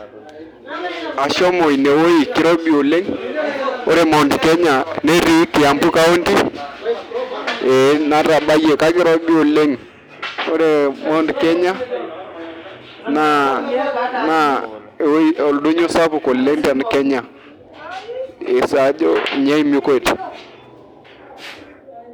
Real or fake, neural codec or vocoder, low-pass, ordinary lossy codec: real; none; none; none